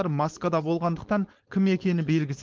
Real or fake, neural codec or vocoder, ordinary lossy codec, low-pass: fake; codec, 16 kHz, 4.8 kbps, FACodec; Opus, 16 kbps; 7.2 kHz